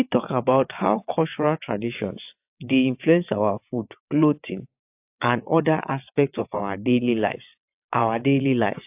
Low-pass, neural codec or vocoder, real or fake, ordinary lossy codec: 3.6 kHz; vocoder, 22.05 kHz, 80 mel bands, WaveNeXt; fake; AAC, 32 kbps